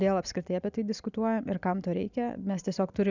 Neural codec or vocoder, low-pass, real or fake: none; 7.2 kHz; real